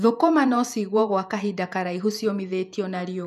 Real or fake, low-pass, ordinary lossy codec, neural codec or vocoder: fake; 14.4 kHz; none; vocoder, 48 kHz, 128 mel bands, Vocos